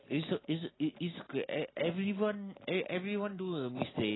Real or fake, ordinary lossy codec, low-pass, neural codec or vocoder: real; AAC, 16 kbps; 7.2 kHz; none